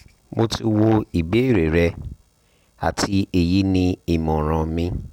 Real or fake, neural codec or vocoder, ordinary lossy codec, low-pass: real; none; none; 19.8 kHz